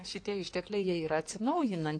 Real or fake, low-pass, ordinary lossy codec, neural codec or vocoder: fake; 9.9 kHz; AAC, 48 kbps; codec, 16 kHz in and 24 kHz out, 2.2 kbps, FireRedTTS-2 codec